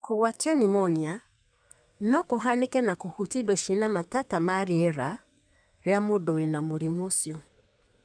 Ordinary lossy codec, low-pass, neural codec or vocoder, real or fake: none; 9.9 kHz; codec, 32 kHz, 1.9 kbps, SNAC; fake